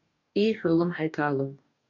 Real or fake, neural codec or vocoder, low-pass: fake; codec, 44.1 kHz, 2.6 kbps, DAC; 7.2 kHz